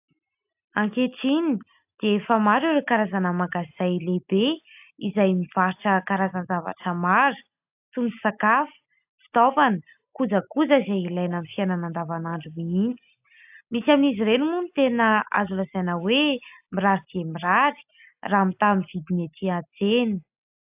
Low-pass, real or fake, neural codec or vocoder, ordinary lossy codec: 3.6 kHz; real; none; AAC, 32 kbps